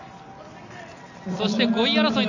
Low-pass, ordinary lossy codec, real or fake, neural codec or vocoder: 7.2 kHz; none; real; none